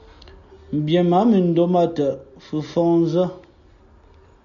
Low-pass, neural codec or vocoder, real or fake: 7.2 kHz; none; real